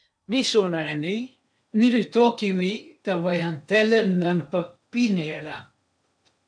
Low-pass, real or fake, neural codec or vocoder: 9.9 kHz; fake; codec, 16 kHz in and 24 kHz out, 0.8 kbps, FocalCodec, streaming, 65536 codes